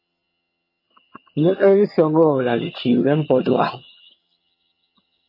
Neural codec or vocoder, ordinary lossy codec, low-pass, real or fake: vocoder, 22.05 kHz, 80 mel bands, HiFi-GAN; MP3, 24 kbps; 5.4 kHz; fake